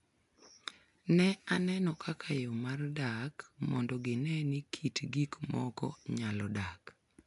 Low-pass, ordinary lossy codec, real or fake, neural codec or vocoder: 10.8 kHz; AAC, 96 kbps; real; none